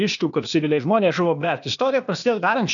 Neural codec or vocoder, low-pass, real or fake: codec, 16 kHz, 0.8 kbps, ZipCodec; 7.2 kHz; fake